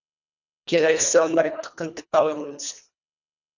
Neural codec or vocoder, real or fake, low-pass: codec, 24 kHz, 1.5 kbps, HILCodec; fake; 7.2 kHz